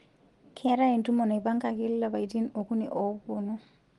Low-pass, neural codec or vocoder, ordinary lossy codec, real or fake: 10.8 kHz; none; Opus, 24 kbps; real